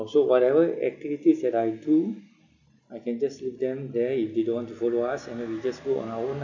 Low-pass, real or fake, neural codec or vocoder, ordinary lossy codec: 7.2 kHz; real; none; none